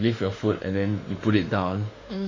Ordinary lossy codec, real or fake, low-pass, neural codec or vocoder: AAC, 32 kbps; fake; 7.2 kHz; autoencoder, 48 kHz, 32 numbers a frame, DAC-VAE, trained on Japanese speech